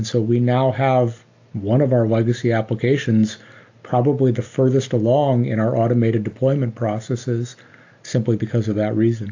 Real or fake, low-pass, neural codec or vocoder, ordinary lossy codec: real; 7.2 kHz; none; AAC, 48 kbps